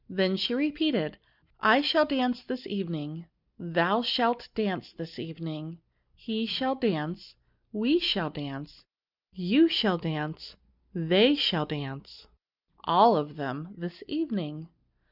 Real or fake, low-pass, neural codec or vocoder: real; 5.4 kHz; none